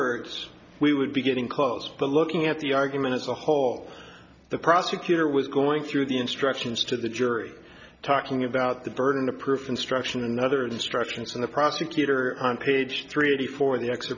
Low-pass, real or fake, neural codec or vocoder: 7.2 kHz; real; none